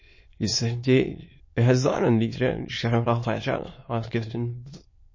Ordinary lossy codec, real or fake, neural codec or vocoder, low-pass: MP3, 32 kbps; fake; autoencoder, 22.05 kHz, a latent of 192 numbers a frame, VITS, trained on many speakers; 7.2 kHz